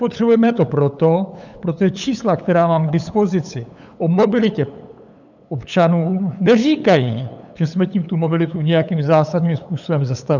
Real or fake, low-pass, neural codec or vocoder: fake; 7.2 kHz; codec, 16 kHz, 8 kbps, FunCodec, trained on LibriTTS, 25 frames a second